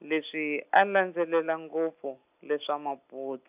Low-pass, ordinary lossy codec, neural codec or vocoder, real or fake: 3.6 kHz; AAC, 32 kbps; none; real